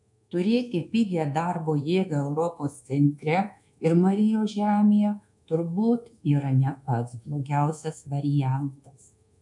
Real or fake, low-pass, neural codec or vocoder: fake; 10.8 kHz; codec, 24 kHz, 1.2 kbps, DualCodec